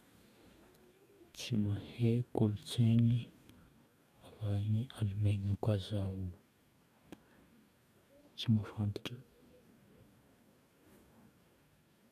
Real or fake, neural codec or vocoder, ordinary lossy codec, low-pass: fake; codec, 44.1 kHz, 2.6 kbps, DAC; none; 14.4 kHz